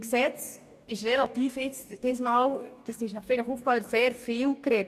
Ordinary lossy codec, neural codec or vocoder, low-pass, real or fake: none; codec, 44.1 kHz, 2.6 kbps, DAC; 14.4 kHz; fake